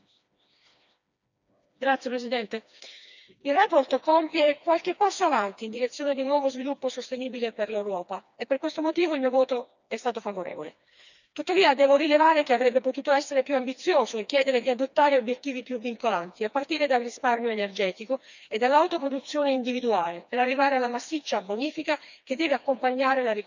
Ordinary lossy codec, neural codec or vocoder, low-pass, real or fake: none; codec, 16 kHz, 2 kbps, FreqCodec, smaller model; 7.2 kHz; fake